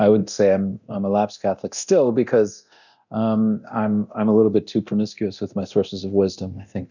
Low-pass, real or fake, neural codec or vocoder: 7.2 kHz; fake; codec, 24 kHz, 0.9 kbps, DualCodec